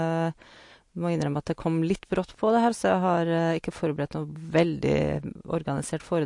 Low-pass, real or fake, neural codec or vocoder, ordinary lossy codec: 10.8 kHz; real; none; MP3, 48 kbps